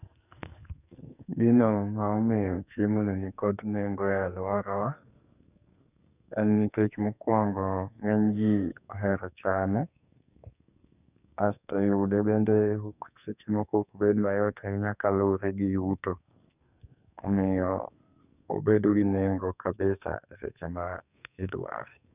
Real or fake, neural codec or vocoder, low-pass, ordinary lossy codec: fake; codec, 44.1 kHz, 2.6 kbps, SNAC; 3.6 kHz; none